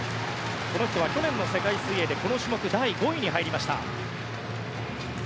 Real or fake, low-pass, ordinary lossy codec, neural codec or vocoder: real; none; none; none